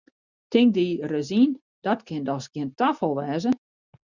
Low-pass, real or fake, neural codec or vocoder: 7.2 kHz; real; none